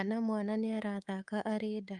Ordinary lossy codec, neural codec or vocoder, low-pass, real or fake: Opus, 32 kbps; codec, 24 kHz, 3.1 kbps, DualCodec; 10.8 kHz; fake